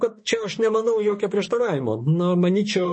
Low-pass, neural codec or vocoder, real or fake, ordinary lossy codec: 9.9 kHz; autoencoder, 48 kHz, 32 numbers a frame, DAC-VAE, trained on Japanese speech; fake; MP3, 32 kbps